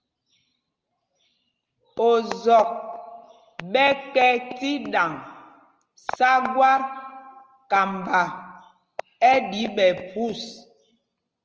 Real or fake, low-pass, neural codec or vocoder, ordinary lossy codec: real; 7.2 kHz; none; Opus, 24 kbps